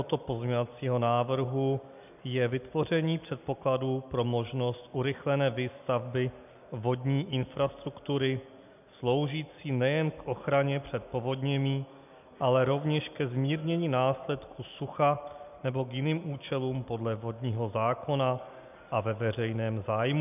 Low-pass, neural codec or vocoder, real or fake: 3.6 kHz; none; real